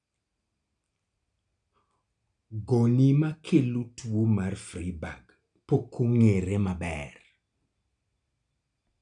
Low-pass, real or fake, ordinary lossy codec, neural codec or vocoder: 9.9 kHz; real; none; none